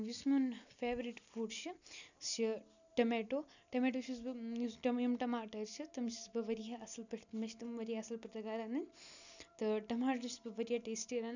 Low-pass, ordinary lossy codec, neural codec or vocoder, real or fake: 7.2 kHz; none; none; real